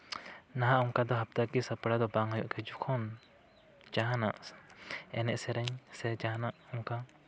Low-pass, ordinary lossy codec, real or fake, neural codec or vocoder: none; none; real; none